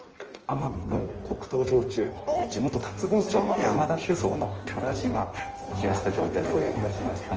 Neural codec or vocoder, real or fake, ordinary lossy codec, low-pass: codec, 16 kHz in and 24 kHz out, 1.1 kbps, FireRedTTS-2 codec; fake; Opus, 24 kbps; 7.2 kHz